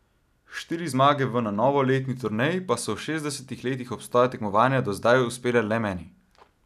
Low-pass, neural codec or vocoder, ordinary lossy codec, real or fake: 14.4 kHz; none; none; real